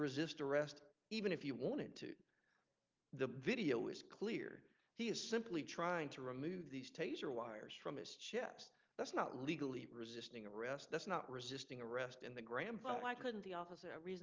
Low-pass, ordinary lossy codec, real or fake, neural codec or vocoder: 7.2 kHz; Opus, 32 kbps; real; none